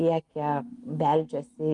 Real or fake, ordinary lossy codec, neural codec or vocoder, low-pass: real; Opus, 32 kbps; none; 10.8 kHz